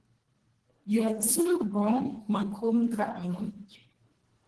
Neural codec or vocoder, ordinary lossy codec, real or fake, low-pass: codec, 24 kHz, 1.5 kbps, HILCodec; Opus, 16 kbps; fake; 10.8 kHz